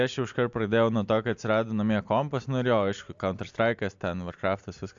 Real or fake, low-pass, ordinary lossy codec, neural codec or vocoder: real; 7.2 kHz; AAC, 64 kbps; none